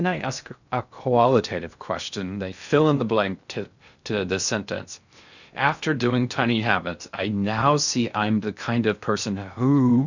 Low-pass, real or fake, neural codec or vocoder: 7.2 kHz; fake; codec, 16 kHz in and 24 kHz out, 0.6 kbps, FocalCodec, streaming, 2048 codes